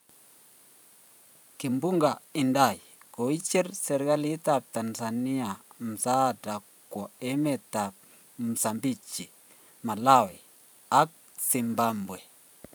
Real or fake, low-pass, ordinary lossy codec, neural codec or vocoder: fake; none; none; vocoder, 44.1 kHz, 128 mel bands every 512 samples, BigVGAN v2